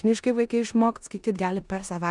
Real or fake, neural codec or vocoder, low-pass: fake; codec, 16 kHz in and 24 kHz out, 0.9 kbps, LongCat-Audio-Codec, four codebook decoder; 10.8 kHz